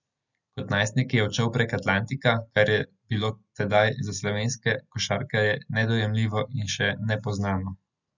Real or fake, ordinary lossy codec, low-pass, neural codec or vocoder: real; none; 7.2 kHz; none